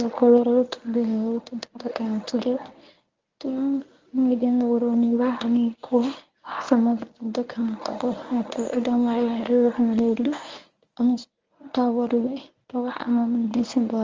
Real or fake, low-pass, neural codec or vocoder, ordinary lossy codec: fake; 7.2 kHz; codec, 24 kHz, 0.9 kbps, WavTokenizer, medium speech release version 1; Opus, 32 kbps